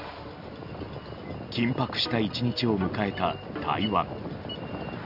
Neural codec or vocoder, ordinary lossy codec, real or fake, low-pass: none; none; real; 5.4 kHz